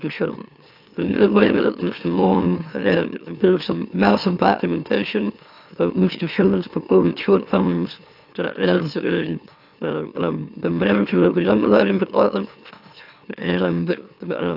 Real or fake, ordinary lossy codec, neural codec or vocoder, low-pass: fake; AAC, 48 kbps; autoencoder, 44.1 kHz, a latent of 192 numbers a frame, MeloTTS; 5.4 kHz